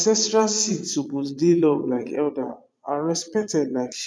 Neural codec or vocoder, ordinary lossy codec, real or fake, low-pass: vocoder, 22.05 kHz, 80 mel bands, Vocos; none; fake; none